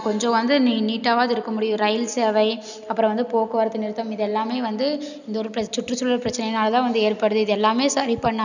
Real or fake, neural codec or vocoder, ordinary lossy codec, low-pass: real; none; none; 7.2 kHz